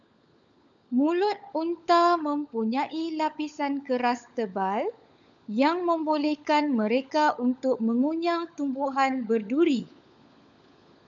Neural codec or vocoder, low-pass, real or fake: codec, 16 kHz, 16 kbps, FunCodec, trained on LibriTTS, 50 frames a second; 7.2 kHz; fake